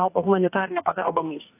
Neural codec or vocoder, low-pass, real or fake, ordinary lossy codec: codec, 44.1 kHz, 2.6 kbps, DAC; 3.6 kHz; fake; AAC, 24 kbps